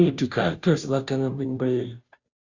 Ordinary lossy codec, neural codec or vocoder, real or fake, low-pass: Opus, 64 kbps; codec, 16 kHz, 0.5 kbps, FunCodec, trained on Chinese and English, 25 frames a second; fake; 7.2 kHz